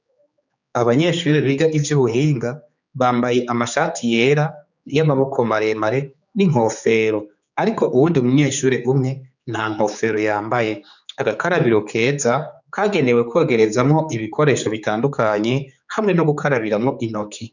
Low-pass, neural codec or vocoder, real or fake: 7.2 kHz; codec, 16 kHz, 4 kbps, X-Codec, HuBERT features, trained on general audio; fake